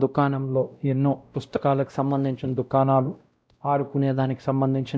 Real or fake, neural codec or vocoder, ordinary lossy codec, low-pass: fake; codec, 16 kHz, 0.5 kbps, X-Codec, WavLM features, trained on Multilingual LibriSpeech; none; none